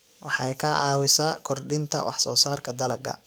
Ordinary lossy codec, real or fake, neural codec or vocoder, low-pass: none; fake; codec, 44.1 kHz, 7.8 kbps, DAC; none